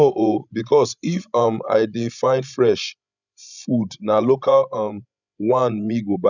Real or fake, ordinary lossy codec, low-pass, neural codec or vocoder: fake; none; 7.2 kHz; codec, 16 kHz, 16 kbps, FreqCodec, larger model